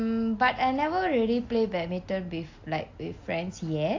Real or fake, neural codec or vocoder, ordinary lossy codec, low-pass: real; none; AAC, 48 kbps; 7.2 kHz